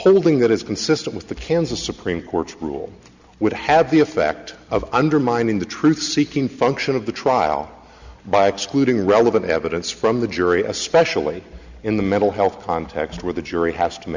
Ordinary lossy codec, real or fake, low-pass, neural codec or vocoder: Opus, 64 kbps; real; 7.2 kHz; none